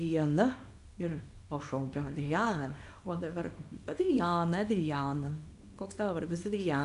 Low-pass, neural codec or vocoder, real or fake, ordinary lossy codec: 10.8 kHz; codec, 24 kHz, 0.9 kbps, WavTokenizer, small release; fake; AAC, 96 kbps